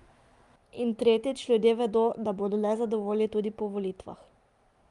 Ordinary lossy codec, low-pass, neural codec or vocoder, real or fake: Opus, 32 kbps; 10.8 kHz; none; real